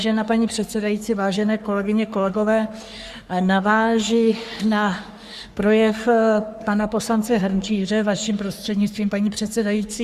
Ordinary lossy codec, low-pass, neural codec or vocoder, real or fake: Opus, 64 kbps; 14.4 kHz; codec, 44.1 kHz, 3.4 kbps, Pupu-Codec; fake